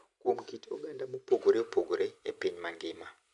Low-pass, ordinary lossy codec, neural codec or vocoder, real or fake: 10.8 kHz; none; none; real